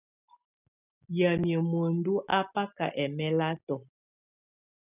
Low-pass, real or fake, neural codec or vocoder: 3.6 kHz; real; none